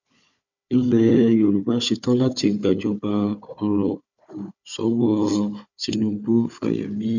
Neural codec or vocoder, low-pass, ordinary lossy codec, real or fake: codec, 16 kHz, 4 kbps, FunCodec, trained on Chinese and English, 50 frames a second; 7.2 kHz; none; fake